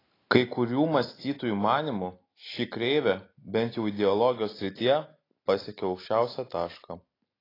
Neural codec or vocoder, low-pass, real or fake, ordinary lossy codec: none; 5.4 kHz; real; AAC, 24 kbps